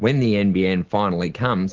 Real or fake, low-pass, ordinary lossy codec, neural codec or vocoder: real; 7.2 kHz; Opus, 16 kbps; none